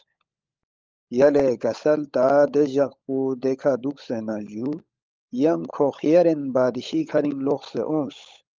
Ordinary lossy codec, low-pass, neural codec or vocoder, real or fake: Opus, 24 kbps; 7.2 kHz; codec, 16 kHz, 16 kbps, FunCodec, trained on LibriTTS, 50 frames a second; fake